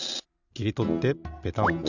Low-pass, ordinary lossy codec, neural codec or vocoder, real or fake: 7.2 kHz; none; none; real